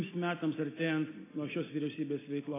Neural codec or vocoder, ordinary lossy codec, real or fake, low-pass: none; AAC, 16 kbps; real; 3.6 kHz